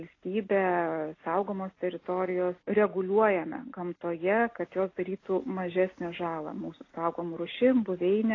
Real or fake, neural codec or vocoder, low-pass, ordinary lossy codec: real; none; 7.2 kHz; AAC, 32 kbps